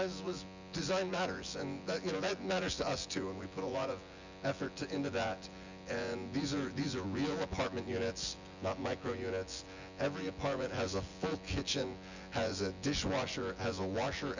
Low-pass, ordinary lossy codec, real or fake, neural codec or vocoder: 7.2 kHz; Opus, 64 kbps; fake; vocoder, 24 kHz, 100 mel bands, Vocos